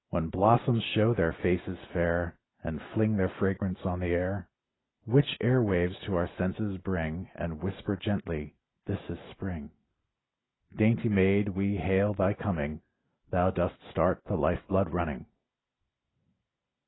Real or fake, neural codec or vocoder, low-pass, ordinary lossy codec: real; none; 7.2 kHz; AAC, 16 kbps